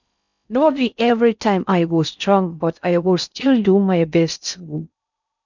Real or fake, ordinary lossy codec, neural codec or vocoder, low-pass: fake; none; codec, 16 kHz in and 24 kHz out, 0.6 kbps, FocalCodec, streaming, 4096 codes; 7.2 kHz